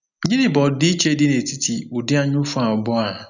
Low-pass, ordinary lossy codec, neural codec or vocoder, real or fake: 7.2 kHz; none; none; real